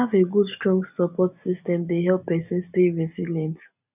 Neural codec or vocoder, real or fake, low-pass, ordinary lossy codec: none; real; 3.6 kHz; none